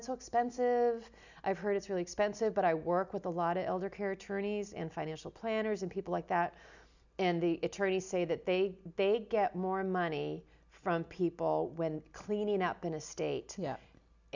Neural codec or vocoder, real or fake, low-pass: none; real; 7.2 kHz